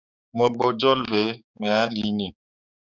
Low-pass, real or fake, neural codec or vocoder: 7.2 kHz; fake; codec, 16 kHz, 4 kbps, X-Codec, HuBERT features, trained on balanced general audio